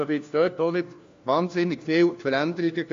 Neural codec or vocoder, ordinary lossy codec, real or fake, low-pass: codec, 16 kHz, 1 kbps, FunCodec, trained on LibriTTS, 50 frames a second; AAC, 48 kbps; fake; 7.2 kHz